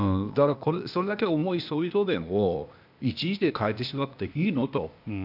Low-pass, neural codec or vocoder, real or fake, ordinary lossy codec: 5.4 kHz; codec, 16 kHz, 0.8 kbps, ZipCodec; fake; none